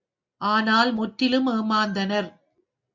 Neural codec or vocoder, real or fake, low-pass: none; real; 7.2 kHz